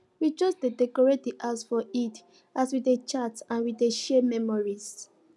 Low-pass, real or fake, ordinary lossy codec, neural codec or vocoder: none; real; none; none